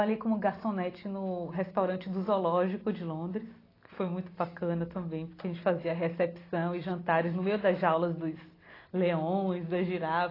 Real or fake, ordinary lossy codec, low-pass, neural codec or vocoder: real; AAC, 24 kbps; 5.4 kHz; none